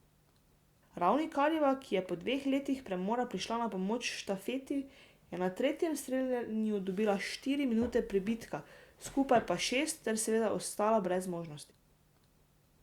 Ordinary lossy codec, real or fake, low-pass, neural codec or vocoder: Opus, 64 kbps; real; 19.8 kHz; none